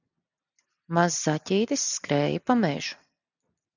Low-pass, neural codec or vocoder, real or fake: 7.2 kHz; none; real